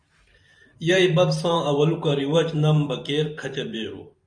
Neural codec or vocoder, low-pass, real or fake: vocoder, 44.1 kHz, 128 mel bands every 512 samples, BigVGAN v2; 9.9 kHz; fake